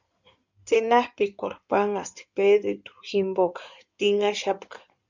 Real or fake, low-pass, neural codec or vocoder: fake; 7.2 kHz; codec, 16 kHz in and 24 kHz out, 2.2 kbps, FireRedTTS-2 codec